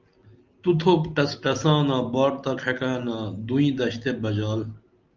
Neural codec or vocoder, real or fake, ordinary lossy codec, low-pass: none; real; Opus, 24 kbps; 7.2 kHz